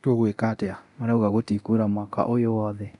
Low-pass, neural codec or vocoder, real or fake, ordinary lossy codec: 10.8 kHz; codec, 24 kHz, 0.9 kbps, DualCodec; fake; none